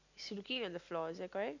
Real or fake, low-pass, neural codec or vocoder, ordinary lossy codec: real; 7.2 kHz; none; none